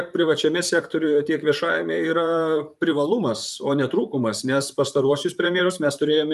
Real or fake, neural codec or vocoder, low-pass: fake; vocoder, 44.1 kHz, 128 mel bands, Pupu-Vocoder; 14.4 kHz